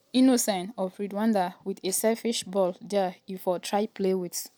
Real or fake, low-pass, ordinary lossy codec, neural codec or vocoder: real; none; none; none